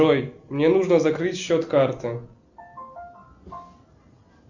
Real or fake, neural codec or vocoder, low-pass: real; none; 7.2 kHz